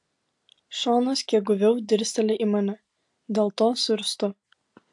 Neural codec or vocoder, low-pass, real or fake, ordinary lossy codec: none; 10.8 kHz; real; AAC, 64 kbps